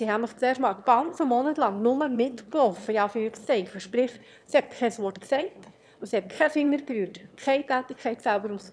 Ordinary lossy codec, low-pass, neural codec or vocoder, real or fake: none; none; autoencoder, 22.05 kHz, a latent of 192 numbers a frame, VITS, trained on one speaker; fake